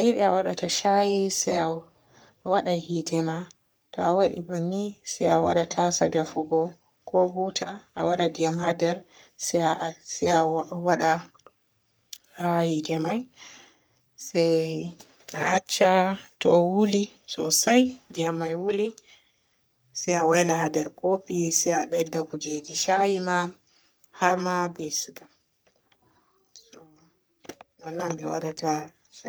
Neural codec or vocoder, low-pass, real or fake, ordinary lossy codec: codec, 44.1 kHz, 3.4 kbps, Pupu-Codec; none; fake; none